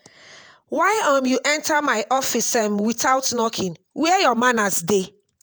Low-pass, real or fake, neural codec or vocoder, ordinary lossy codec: none; fake; vocoder, 48 kHz, 128 mel bands, Vocos; none